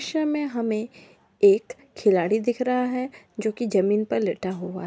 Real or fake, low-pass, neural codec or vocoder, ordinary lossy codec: real; none; none; none